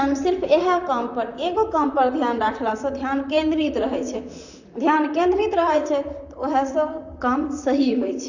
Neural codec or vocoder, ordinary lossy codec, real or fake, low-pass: vocoder, 44.1 kHz, 128 mel bands, Pupu-Vocoder; none; fake; 7.2 kHz